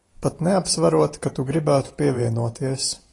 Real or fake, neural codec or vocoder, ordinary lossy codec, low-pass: fake; vocoder, 44.1 kHz, 128 mel bands every 512 samples, BigVGAN v2; AAC, 32 kbps; 10.8 kHz